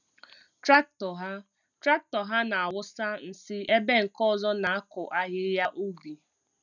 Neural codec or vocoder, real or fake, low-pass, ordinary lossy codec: none; real; 7.2 kHz; none